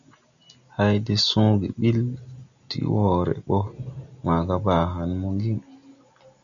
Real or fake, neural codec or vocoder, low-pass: real; none; 7.2 kHz